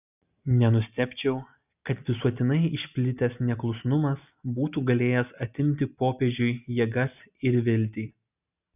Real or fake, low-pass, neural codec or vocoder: real; 3.6 kHz; none